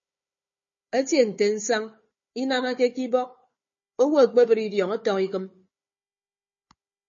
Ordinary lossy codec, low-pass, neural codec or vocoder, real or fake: MP3, 32 kbps; 7.2 kHz; codec, 16 kHz, 4 kbps, FunCodec, trained on Chinese and English, 50 frames a second; fake